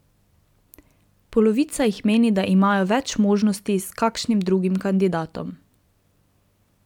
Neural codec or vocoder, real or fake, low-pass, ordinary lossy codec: vocoder, 44.1 kHz, 128 mel bands every 256 samples, BigVGAN v2; fake; 19.8 kHz; none